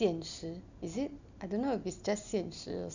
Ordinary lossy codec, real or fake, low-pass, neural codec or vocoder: none; real; 7.2 kHz; none